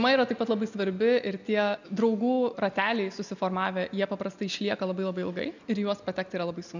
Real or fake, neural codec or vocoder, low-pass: real; none; 7.2 kHz